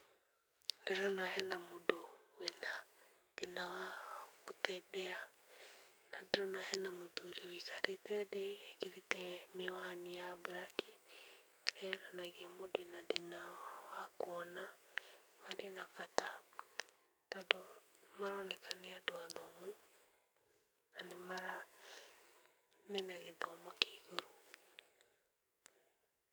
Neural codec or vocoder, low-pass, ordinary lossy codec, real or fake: codec, 44.1 kHz, 2.6 kbps, SNAC; none; none; fake